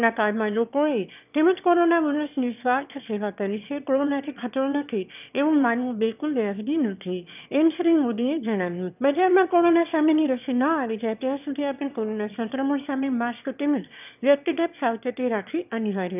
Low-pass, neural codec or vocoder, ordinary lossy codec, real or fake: 3.6 kHz; autoencoder, 22.05 kHz, a latent of 192 numbers a frame, VITS, trained on one speaker; none; fake